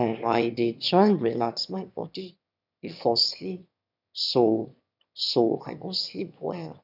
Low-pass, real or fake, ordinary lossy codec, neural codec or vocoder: 5.4 kHz; fake; none; autoencoder, 22.05 kHz, a latent of 192 numbers a frame, VITS, trained on one speaker